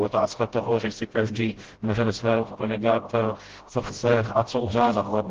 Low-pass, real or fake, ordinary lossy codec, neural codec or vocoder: 7.2 kHz; fake; Opus, 16 kbps; codec, 16 kHz, 0.5 kbps, FreqCodec, smaller model